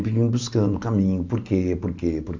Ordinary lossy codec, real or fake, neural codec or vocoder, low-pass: MP3, 64 kbps; real; none; 7.2 kHz